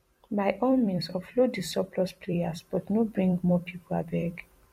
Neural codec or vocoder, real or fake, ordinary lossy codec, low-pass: vocoder, 44.1 kHz, 128 mel bands every 256 samples, BigVGAN v2; fake; MP3, 64 kbps; 19.8 kHz